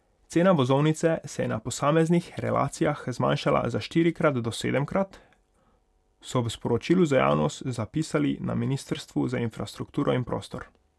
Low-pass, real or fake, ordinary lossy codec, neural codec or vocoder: none; real; none; none